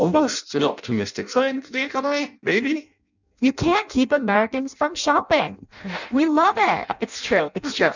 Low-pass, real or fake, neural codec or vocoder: 7.2 kHz; fake; codec, 16 kHz in and 24 kHz out, 0.6 kbps, FireRedTTS-2 codec